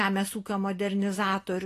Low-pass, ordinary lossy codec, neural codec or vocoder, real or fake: 14.4 kHz; AAC, 48 kbps; none; real